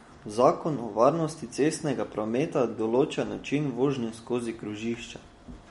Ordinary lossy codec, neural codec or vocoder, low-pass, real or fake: MP3, 48 kbps; none; 19.8 kHz; real